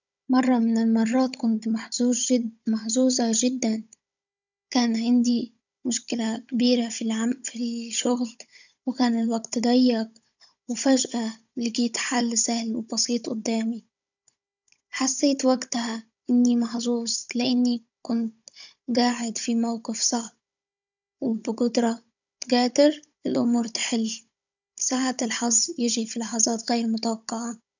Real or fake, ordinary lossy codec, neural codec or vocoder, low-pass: fake; none; codec, 16 kHz, 16 kbps, FunCodec, trained on Chinese and English, 50 frames a second; 7.2 kHz